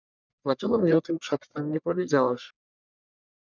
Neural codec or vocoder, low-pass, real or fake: codec, 44.1 kHz, 1.7 kbps, Pupu-Codec; 7.2 kHz; fake